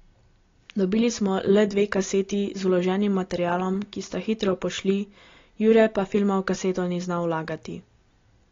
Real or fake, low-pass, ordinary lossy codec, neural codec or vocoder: real; 7.2 kHz; AAC, 32 kbps; none